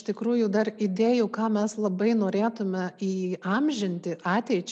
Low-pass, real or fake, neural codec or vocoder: 10.8 kHz; real; none